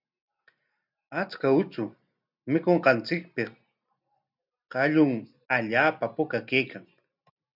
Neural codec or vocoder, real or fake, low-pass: none; real; 5.4 kHz